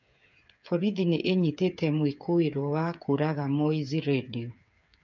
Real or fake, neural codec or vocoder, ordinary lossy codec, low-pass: fake; codec, 16 kHz, 8 kbps, FreqCodec, smaller model; none; 7.2 kHz